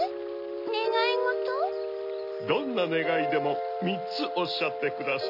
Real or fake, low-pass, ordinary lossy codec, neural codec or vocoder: real; 5.4 kHz; none; none